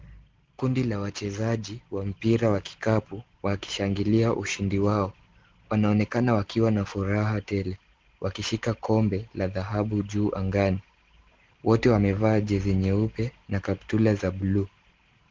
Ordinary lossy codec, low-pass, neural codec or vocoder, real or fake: Opus, 16 kbps; 7.2 kHz; none; real